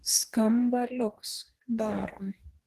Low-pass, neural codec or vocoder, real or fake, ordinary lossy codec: 14.4 kHz; codec, 44.1 kHz, 2.6 kbps, DAC; fake; Opus, 16 kbps